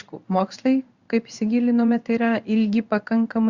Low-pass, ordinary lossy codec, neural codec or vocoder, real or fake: 7.2 kHz; Opus, 64 kbps; codec, 16 kHz in and 24 kHz out, 1 kbps, XY-Tokenizer; fake